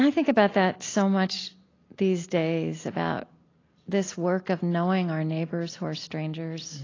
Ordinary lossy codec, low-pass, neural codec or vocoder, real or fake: AAC, 32 kbps; 7.2 kHz; none; real